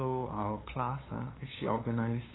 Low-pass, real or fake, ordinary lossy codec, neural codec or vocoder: 7.2 kHz; fake; AAC, 16 kbps; codec, 16 kHz, 8 kbps, FunCodec, trained on LibriTTS, 25 frames a second